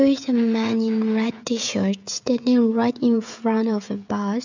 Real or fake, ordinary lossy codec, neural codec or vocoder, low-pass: fake; none; codec, 16 kHz, 16 kbps, FreqCodec, smaller model; 7.2 kHz